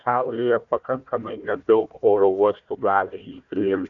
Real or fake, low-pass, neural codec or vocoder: fake; 7.2 kHz; codec, 16 kHz, 1 kbps, FunCodec, trained on Chinese and English, 50 frames a second